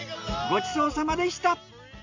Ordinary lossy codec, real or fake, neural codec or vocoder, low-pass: MP3, 48 kbps; real; none; 7.2 kHz